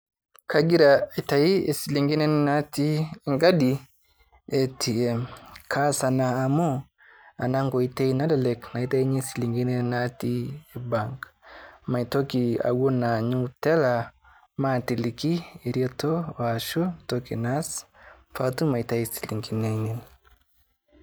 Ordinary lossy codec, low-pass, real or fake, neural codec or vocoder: none; none; real; none